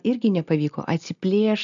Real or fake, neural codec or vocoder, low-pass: real; none; 7.2 kHz